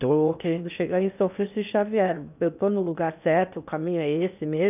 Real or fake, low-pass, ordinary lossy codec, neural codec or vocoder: fake; 3.6 kHz; none; codec, 16 kHz in and 24 kHz out, 0.8 kbps, FocalCodec, streaming, 65536 codes